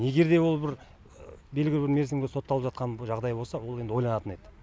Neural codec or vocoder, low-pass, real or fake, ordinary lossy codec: none; none; real; none